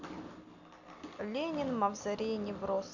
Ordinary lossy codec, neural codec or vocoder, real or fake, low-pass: none; none; real; 7.2 kHz